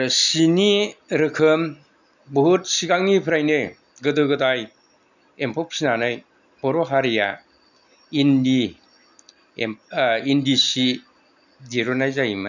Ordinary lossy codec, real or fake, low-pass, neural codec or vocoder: none; real; 7.2 kHz; none